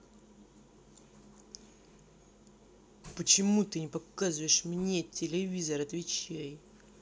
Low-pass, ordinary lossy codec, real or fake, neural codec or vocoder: none; none; real; none